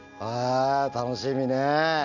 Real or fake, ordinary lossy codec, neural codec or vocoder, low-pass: real; none; none; 7.2 kHz